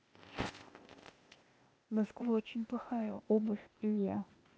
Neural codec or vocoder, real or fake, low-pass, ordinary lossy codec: codec, 16 kHz, 0.8 kbps, ZipCodec; fake; none; none